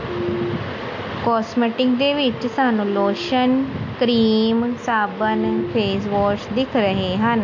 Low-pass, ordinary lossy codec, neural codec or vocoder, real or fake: 7.2 kHz; MP3, 48 kbps; none; real